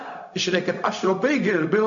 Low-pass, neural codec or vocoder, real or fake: 7.2 kHz; codec, 16 kHz, 0.4 kbps, LongCat-Audio-Codec; fake